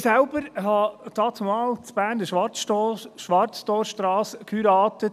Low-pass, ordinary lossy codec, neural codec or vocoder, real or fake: 14.4 kHz; none; none; real